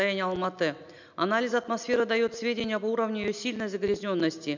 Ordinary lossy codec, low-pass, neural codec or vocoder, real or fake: none; 7.2 kHz; none; real